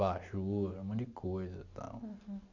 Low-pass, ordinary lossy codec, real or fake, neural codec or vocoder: 7.2 kHz; Opus, 64 kbps; real; none